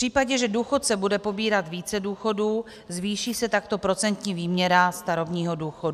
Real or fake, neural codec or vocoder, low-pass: real; none; 14.4 kHz